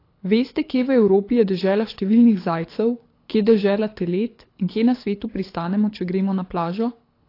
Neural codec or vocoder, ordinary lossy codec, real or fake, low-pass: codec, 24 kHz, 6 kbps, HILCodec; AAC, 32 kbps; fake; 5.4 kHz